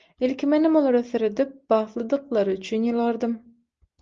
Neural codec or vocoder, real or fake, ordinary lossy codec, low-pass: none; real; Opus, 32 kbps; 7.2 kHz